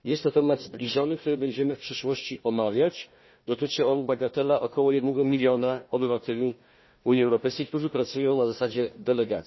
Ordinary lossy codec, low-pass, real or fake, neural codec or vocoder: MP3, 24 kbps; 7.2 kHz; fake; codec, 16 kHz, 1 kbps, FunCodec, trained on Chinese and English, 50 frames a second